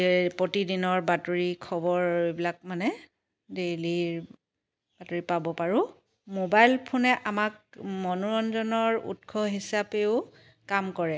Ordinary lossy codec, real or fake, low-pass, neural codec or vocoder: none; real; none; none